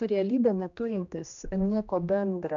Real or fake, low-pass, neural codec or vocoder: fake; 7.2 kHz; codec, 16 kHz, 1 kbps, X-Codec, HuBERT features, trained on general audio